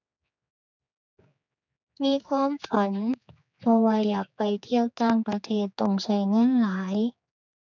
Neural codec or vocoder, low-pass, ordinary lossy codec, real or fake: codec, 16 kHz, 4 kbps, X-Codec, HuBERT features, trained on general audio; 7.2 kHz; none; fake